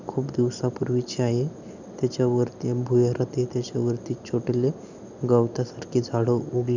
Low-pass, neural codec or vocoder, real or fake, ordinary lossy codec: 7.2 kHz; none; real; none